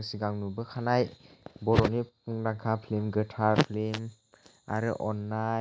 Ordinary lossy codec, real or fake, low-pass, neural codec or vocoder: none; real; none; none